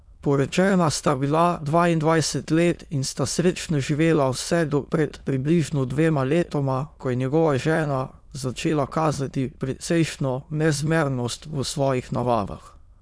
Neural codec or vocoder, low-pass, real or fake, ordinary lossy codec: autoencoder, 22.05 kHz, a latent of 192 numbers a frame, VITS, trained on many speakers; none; fake; none